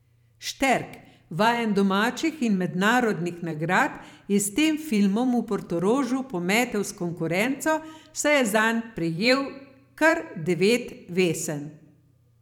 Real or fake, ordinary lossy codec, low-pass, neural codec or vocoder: fake; none; 19.8 kHz; vocoder, 44.1 kHz, 128 mel bands every 512 samples, BigVGAN v2